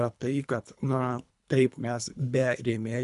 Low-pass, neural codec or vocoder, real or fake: 10.8 kHz; codec, 24 kHz, 3 kbps, HILCodec; fake